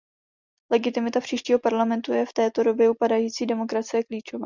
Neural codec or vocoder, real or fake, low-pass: none; real; 7.2 kHz